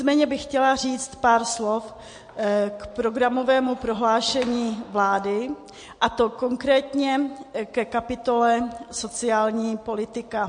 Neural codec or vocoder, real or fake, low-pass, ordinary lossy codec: none; real; 9.9 kHz; MP3, 48 kbps